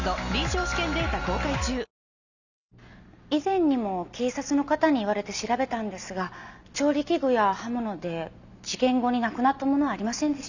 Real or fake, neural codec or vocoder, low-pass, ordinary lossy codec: real; none; 7.2 kHz; none